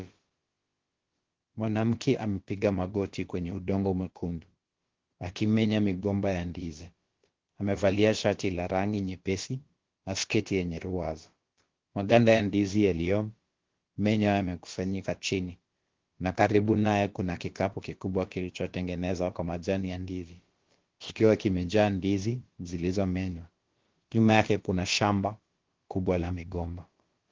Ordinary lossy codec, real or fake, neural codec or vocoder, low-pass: Opus, 16 kbps; fake; codec, 16 kHz, about 1 kbps, DyCAST, with the encoder's durations; 7.2 kHz